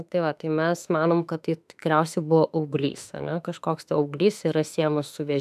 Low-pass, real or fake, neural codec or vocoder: 14.4 kHz; fake; autoencoder, 48 kHz, 32 numbers a frame, DAC-VAE, trained on Japanese speech